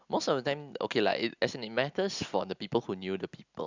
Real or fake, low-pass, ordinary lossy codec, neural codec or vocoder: real; 7.2 kHz; Opus, 64 kbps; none